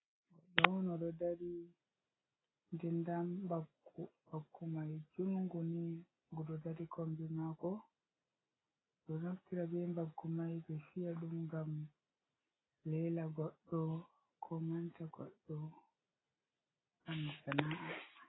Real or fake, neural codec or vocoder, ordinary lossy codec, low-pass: real; none; AAC, 16 kbps; 7.2 kHz